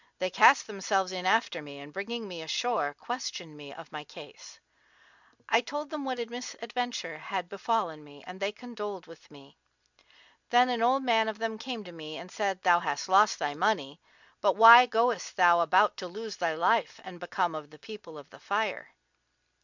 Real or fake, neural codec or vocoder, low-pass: real; none; 7.2 kHz